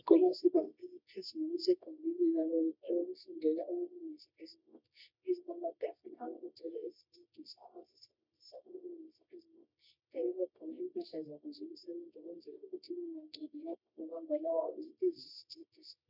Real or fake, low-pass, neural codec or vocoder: fake; 5.4 kHz; codec, 24 kHz, 0.9 kbps, WavTokenizer, medium music audio release